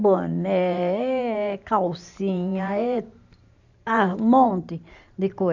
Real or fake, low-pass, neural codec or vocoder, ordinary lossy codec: fake; 7.2 kHz; vocoder, 44.1 kHz, 128 mel bands every 512 samples, BigVGAN v2; none